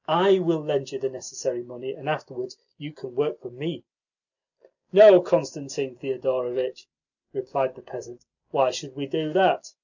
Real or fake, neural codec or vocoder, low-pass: real; none; 7.2 kHz